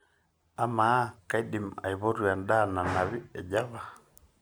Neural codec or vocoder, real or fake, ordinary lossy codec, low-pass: none; real; none; none